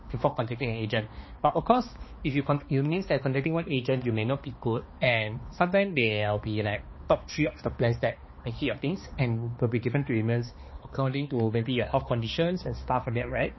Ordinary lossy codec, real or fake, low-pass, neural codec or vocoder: MP3, 24 kbps; fake; 7.2 kHz; codec, 16 kHz, 2 kbps, X-Codec, HuBERT features, trained on balanced general audio